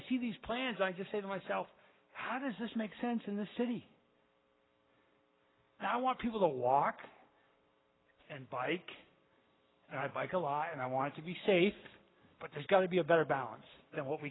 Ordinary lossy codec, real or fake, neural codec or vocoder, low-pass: AAC, 16 kbps; fake; codec, 44.1 kHz, 7.8 kbps, Pupu-Codec; 7.2 kHz